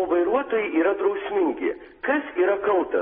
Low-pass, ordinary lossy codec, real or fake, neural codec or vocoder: 19.8 kHz; AAC, 16 kbps; real; none